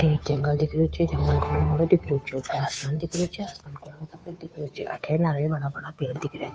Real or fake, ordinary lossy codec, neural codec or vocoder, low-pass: fake; none; codec, 16 kHz, 2 kbps, FunCodec, trained on Chinese and English, 25 frames a second; none